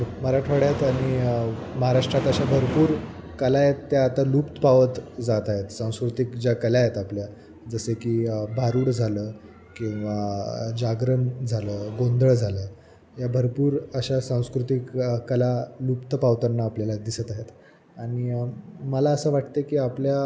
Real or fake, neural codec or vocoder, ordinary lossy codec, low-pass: real; none; none; none